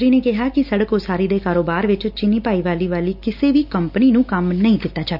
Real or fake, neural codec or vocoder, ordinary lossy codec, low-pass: real; none; none; 5.4 kHz